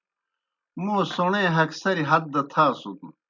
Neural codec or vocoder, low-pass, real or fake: none; 7.2 kHz; real